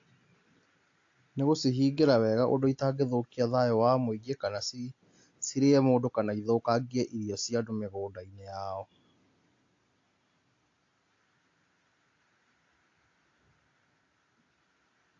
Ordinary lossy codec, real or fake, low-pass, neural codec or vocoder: AAC, 48 kbps; real; 7.2 kHz; none